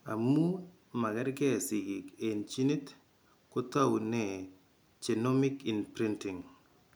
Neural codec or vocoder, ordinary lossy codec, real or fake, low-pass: none; none; real; none